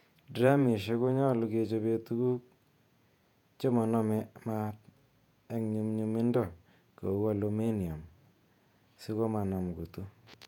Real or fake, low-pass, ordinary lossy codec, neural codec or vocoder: fake; 19.8 kHz; none; vocoder, 48 kHz, 128 mel bands, Vocos